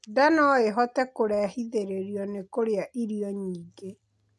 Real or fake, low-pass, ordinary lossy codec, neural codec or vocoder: real; none; none; none